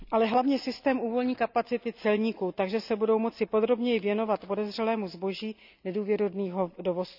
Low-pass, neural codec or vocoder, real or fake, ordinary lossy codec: 5.4 kHz; none; real; none